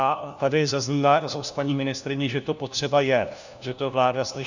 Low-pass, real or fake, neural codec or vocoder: 7.2 kHz; fake; codec, 16 kHz, 1 kbps, FunCodec, trained on LibriTTS, 50 frames a second